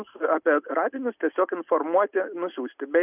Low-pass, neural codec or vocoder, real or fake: 3.6 kHz; none; real